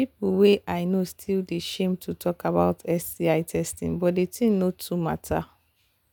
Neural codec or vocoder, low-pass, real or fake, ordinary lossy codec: none; none; real; none